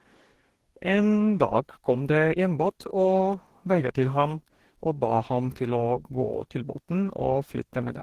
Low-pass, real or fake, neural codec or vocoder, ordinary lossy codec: 14.4 kHz; fake; codec, 44.1 kHz, 2.6 kbps, DAC; Opus, 16 kbps